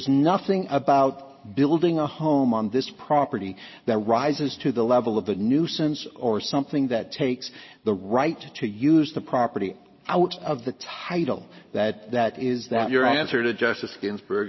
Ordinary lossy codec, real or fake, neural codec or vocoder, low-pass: MP3, 24 kbps; real; none; 7.2 kHz